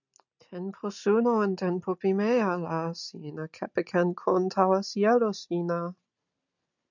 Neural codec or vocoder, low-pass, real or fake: none; 7.2 kHz; real